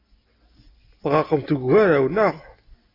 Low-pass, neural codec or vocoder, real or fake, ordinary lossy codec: 5.4 kHz; none; real; AAC, 24 kbps